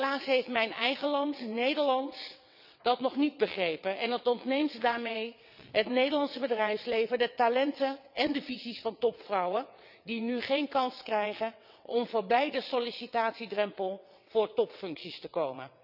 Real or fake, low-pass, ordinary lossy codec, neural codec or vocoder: fake; 5.4 kHz; none; vocoder, 22.05 kHz, 80 mel bands, WaveNeXt